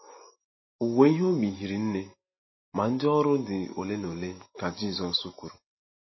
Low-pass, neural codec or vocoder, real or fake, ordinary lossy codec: 7.2 kHz; none; real; MP3, 24 kbps